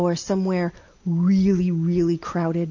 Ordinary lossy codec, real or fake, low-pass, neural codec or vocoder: MP3, 48 kbps; real; 7.2 kHz; none